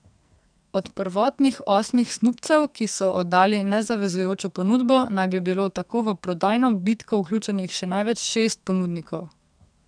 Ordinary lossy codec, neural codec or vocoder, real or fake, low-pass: none; codec, 44.1 kHz, 2.6 kbps, SNAC; fake; 9.9 kHz